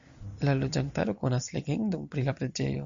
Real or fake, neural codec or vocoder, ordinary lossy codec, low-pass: real; none; MP3, 64 kbps; 7.2 kHz